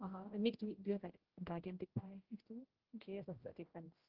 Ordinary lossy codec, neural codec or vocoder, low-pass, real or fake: Opus, 16 kbps; codec, 16 kHz, 0.5 kbps, X-Codec, HuBERT features, trained on general audio; 5.4 kHz; fake